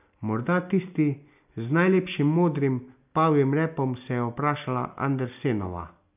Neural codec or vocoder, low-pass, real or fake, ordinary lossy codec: none; 3.6 kHz; real; none